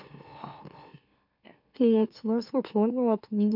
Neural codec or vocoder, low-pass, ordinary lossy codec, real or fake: autoencoder, 44.1 kHz, a latent of 192 numbers a frame, MeloTTS; 5.4 kHz; none; fake